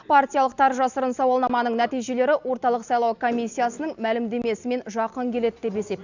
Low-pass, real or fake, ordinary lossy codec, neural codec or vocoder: 7.2 kHz; real; Opus, 64 kbps; none